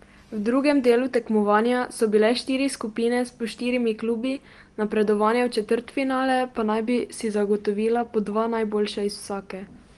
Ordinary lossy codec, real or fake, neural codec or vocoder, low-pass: Opus, 24 kbps; real; none; 10.8 kHz